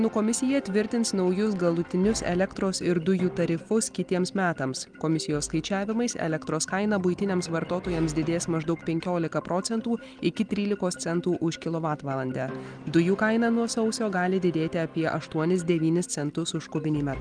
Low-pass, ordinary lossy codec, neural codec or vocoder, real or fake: 9.9 kHz; Opus, 32 kbps; none; real